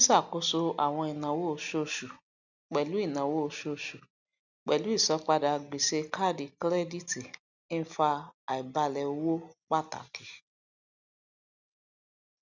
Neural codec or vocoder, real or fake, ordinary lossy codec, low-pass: none; real; none; 7.2 kHz